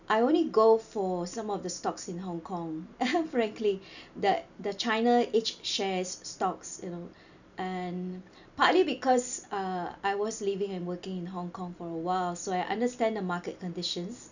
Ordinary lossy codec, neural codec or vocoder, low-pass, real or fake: none; none; 7.2 kHz; real